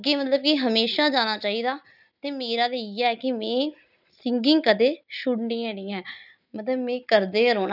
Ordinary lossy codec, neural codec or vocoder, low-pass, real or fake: none; none; 5.4 kHz; real